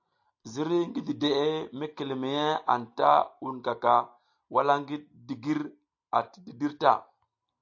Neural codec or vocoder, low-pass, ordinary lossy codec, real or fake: none; 7.2 kHz; AAC, 48 kbps; real